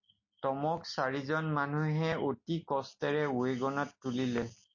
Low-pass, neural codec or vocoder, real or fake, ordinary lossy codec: 7.2 kHz; none; real; MP3, 32 kbps